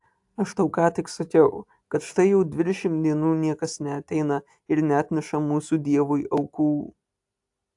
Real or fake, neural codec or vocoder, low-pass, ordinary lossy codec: real; none; 10.8 kHz; AAC, 64 kbps